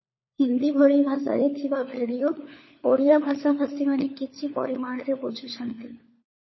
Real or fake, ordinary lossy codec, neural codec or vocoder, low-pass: fake; MP3, 24 kbps; codec, 16 kHz, 4 kbps, FunCodec, trained on LibriTTS, 50 frames a second; 7.2 kHz